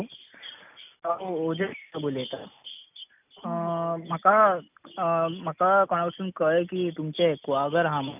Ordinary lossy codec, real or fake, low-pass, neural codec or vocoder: none; real; 3.6 kHz; none